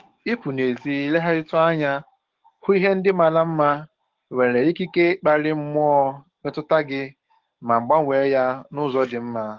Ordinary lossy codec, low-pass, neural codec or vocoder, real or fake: Opus, 16 kbps; 7.2 kHz; codec, 44.1 kHz, 7.8 kbps, DAC; fake